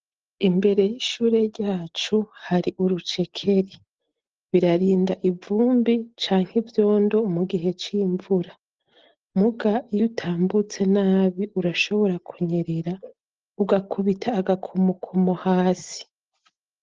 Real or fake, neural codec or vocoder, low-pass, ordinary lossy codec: real; none; 7.2 kHz; Opus, 16 kbps